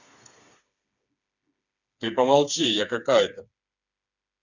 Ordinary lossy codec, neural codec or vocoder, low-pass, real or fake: none; codec, 16 kHz, 4 kbps, FreqCodec, smaller model; 7.2 kHz; fake